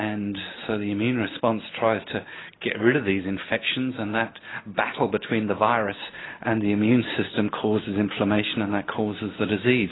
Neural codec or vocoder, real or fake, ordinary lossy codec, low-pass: none; real; AAC, 16 kbps; 7.2 kHz